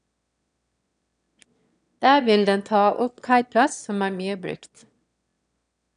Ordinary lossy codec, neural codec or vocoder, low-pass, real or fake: none; autoencoder, 22.05 kHz, a latent of 192 numbers a frame, VITS, trained on one speaker; 9.9 kHz; fake